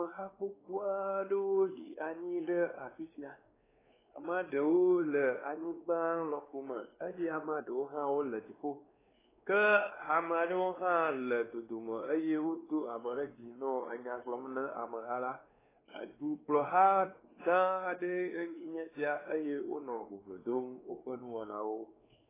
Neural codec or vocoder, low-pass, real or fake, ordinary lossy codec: codec, 16 kHz, 2 kbps, X-Codec, WavLM features, trained on Multilingual LibriSpeech; 3.6 kHz; fake; AAC, 16 kbps